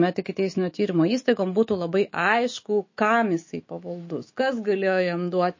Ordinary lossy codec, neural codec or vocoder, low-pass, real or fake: MP3, 32 kbps; autoencoder, 48 kHz, 128 numbers a frame, DAC-VAE, trained on Japanese speech; 7.2 kHz; fake